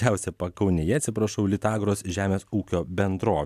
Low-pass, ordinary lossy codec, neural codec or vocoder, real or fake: 14.4 kHz; AAC, 96 kbps; none; real